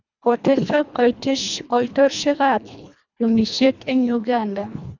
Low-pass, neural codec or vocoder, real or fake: 7.2 kHz; codec, 24 kHz, 1.5 kbps, HILCodec; fake